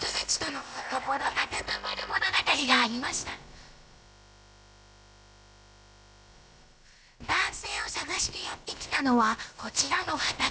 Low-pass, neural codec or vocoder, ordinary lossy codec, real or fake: none; codec, 16 kHz, about 1 kbps, DyCAST, with the encoder's durations; none; fake